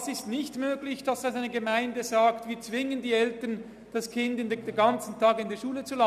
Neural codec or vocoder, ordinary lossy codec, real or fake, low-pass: none; none; real; 14.4 kHz